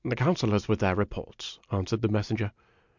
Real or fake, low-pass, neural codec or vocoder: real; 7.2 kHz; none